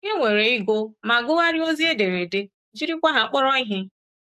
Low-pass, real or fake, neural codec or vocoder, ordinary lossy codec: 14.4 kHz; fake; codec, 44.1 kHz, 7.8 kbps, DAC; AAC, 96 kbps